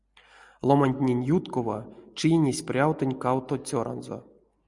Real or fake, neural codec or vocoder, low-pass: real; none; 10.8 kHz